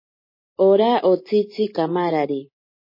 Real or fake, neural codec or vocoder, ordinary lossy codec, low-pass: real; none; MP3, 24 kbps; 5.4 kHz